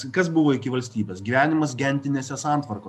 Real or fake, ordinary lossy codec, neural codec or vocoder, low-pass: real; MP3, 96 kbps; none; 14.4 kHz